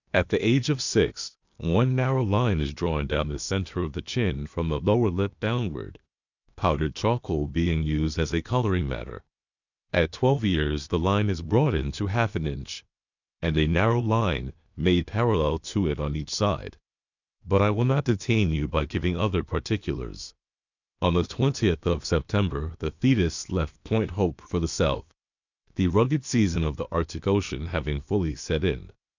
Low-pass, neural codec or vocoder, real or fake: 7.2 kHz; codec, 16 kHz, 0.8 kbps, ZipCodec; fake